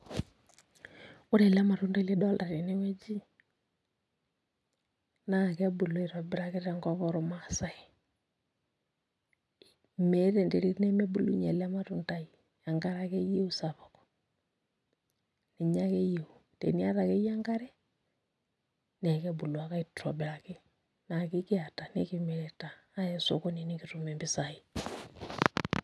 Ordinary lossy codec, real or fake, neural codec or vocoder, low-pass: none; real; none; none